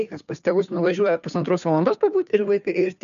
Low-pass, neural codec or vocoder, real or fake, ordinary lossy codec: 7.2 kHz; codec, 16 kHz, 2 kbps, FunCodec, trained on Chinese and English, 25 frames a second; fake; MP3, 96 kbps